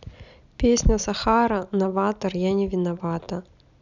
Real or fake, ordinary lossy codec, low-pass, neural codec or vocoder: real; none; 7.2 kHz; none